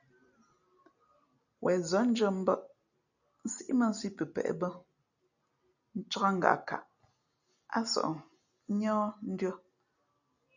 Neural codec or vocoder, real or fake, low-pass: none; real; 7.2 kHz